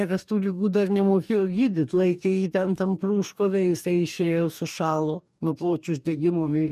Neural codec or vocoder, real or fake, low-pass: codec, 44.1 kHz, 2.6 kbps, DAC; fake; 14.4 kHz